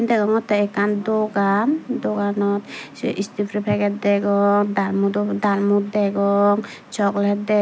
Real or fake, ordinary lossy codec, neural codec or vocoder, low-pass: real; none; none; none